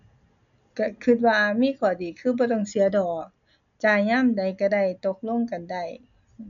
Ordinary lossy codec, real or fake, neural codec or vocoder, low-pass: none; real; none; 7.2 kHz